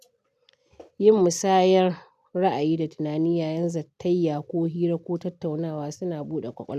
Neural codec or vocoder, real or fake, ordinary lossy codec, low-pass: none; real; none; 14.4 kHz